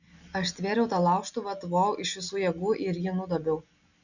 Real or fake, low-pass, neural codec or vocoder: real; 7.2 kHz; none